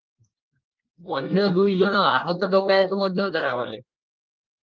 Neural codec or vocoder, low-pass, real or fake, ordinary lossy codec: codec, 24 kHz, 1 kbps, SNAC; 7.2 kHz; fake; Opus, 24 kbps